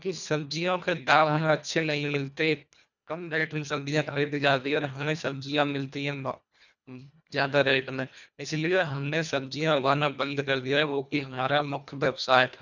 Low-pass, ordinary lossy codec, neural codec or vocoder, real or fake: 7.2 kHz; none; codec, 24 kHz, 1.5 kbps, HILCodec; fake